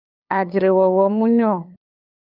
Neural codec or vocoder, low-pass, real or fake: codec, 16 kHz, 8 kbps, FunCodec, trained on LibriTTS, 25 frames a second; 5.4 kHz; fake